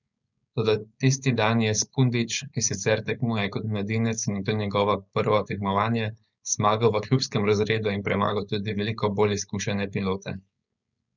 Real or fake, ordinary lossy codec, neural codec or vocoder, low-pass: fake; none; codec, 16 kHz, 4.8 kbps, FACodec; 7.2 kHz